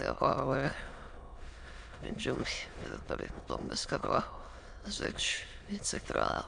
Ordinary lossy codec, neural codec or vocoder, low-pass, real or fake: AAC, 64 kbps; autoencoder, 22.05 kHz, a latent of 192 numbers a frame, VITS, trained on many speakers; 9.9 kHz; fake